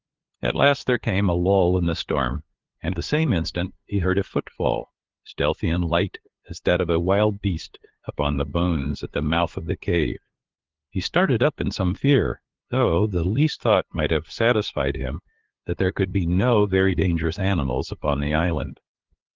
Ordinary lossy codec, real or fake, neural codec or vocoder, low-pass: Opus, 16 kbps; fake; codec, 16 kHz, 2 kbps, FunCodec, trained on LibriTTS, 25 frames a second; 7.2 kHz